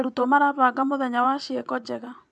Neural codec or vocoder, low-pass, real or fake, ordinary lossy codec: vocoder, 24 kHz, 100 mel bands, Vocos; none; fake; none